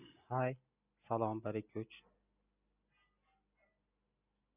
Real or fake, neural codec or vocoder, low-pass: real; none; 3.6 kHz